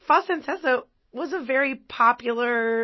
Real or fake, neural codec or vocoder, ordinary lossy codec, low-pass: real; none; MP3, 24 kbps; 7.2 kHz